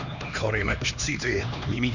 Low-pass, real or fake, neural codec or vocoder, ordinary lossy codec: 7.2 kHz; fake; codec, 16 kHz, 2 kbps, X-Codec, HuBERT features, trained on LibriSpeech; none